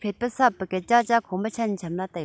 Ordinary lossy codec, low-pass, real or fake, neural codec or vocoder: none; none; real; none